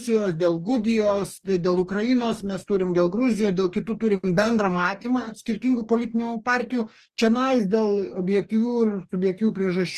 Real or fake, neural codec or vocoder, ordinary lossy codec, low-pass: fake; codec, 44.1 kHz, 2.6 kbps, DAC; Opus, 24 kbps; 14.4 kHz